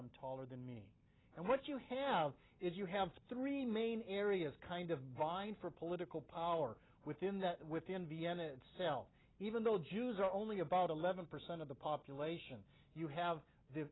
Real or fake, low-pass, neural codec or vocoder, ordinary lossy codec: real; 7.2 kHz; none; AAC, 16 kbps